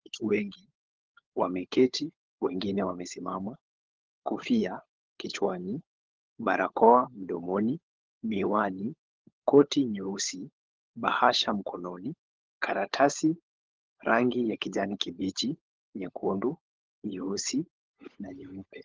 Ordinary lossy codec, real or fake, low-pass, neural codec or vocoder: Opus, 16 kbps; fake; 7.2 kHz; codec, 16 kHz, 16 kbps, FunCodec, trained on LibriTTS, 50 frames a second